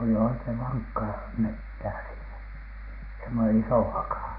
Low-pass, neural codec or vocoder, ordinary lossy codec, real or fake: 5.4 kHz; none; AAC, 32 kbps; real